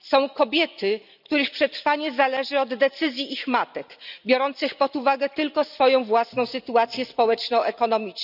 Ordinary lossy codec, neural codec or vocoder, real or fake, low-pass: none; none; real; 5.4 kHz